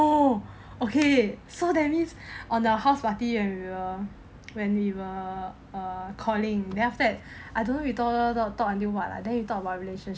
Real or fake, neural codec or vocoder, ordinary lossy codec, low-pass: real; none; none; none